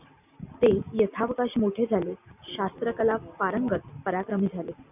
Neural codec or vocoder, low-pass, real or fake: none; 3.6 kHz; real